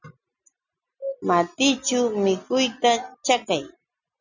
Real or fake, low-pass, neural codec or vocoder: real; 7.2 kHz; none